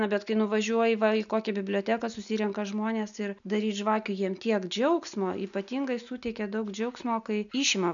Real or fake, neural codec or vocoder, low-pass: real; none; 7.2 kHz